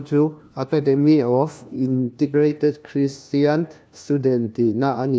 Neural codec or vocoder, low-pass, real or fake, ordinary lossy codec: codec, 16 kHz, 1 kbps, FunCodec, trained on LibriTTS, 50 frames a second; none; fake; none